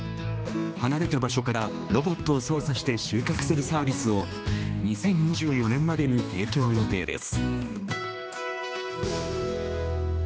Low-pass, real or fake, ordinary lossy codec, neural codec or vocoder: none; fake; none; codec, 16 kHz, 2 kbps, X-Codec, HuBERT features, trained on general audio